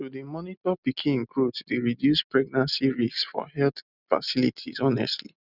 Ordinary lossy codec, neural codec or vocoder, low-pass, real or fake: none; none; 5.4 kHz; real